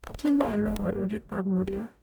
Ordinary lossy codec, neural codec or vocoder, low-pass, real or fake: none; codec, 44.1 kHz, 0.9 kbps, DAC; none; fake